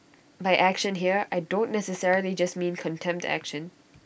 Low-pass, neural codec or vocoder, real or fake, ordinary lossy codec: none; none; real; none